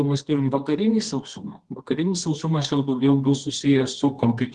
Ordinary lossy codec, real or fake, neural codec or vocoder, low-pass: Opus, 16 kbps; fake; codec, 24 kHz, 0.9 kbps, WavTokenizer, medium music audio release; 10.8 kHz